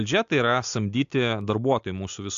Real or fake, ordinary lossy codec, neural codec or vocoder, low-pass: real; AAC, 64 kbps; none; 7.2 kHz